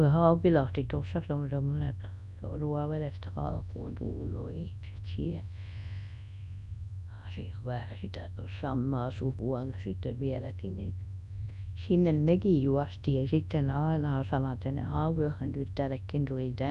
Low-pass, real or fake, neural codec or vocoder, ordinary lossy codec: 10.8 kHz; fake; codec, 24 kHz, 0.9 kbps, WavTokenizer, large speech release; none